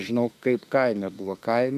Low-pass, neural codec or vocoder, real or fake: 14.4 kHz; autoencoder, 48 kHz, 32 numbers a frame, DAC-VAE, trained on Japanese speech; fake